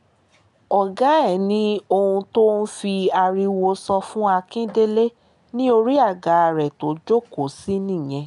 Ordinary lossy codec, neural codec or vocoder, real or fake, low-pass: none; none; real; 10.8 kHz